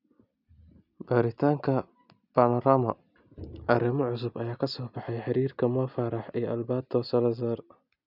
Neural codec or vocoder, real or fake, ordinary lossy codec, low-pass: none; real; none; 5.4 kHz